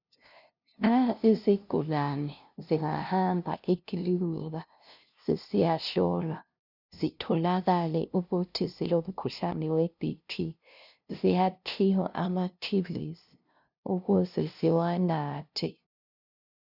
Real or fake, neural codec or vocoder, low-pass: fake; codec, 16 kHz, 0.5 kbps, FunCodec, trained on LibriTTS, 25 frames a second; 5.4 kHz